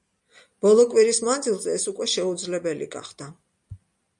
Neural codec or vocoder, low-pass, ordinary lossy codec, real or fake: none; 10.8 kHz; MP3, 96 kbps; real